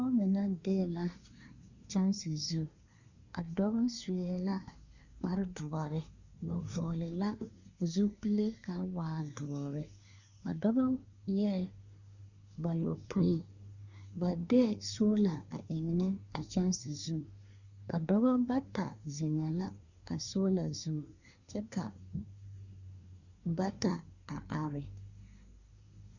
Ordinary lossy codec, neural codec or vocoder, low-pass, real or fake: Opus, 64 kbps; codec, 24 kHz, 1 kbps, SNAC; 7.2 kHz; fake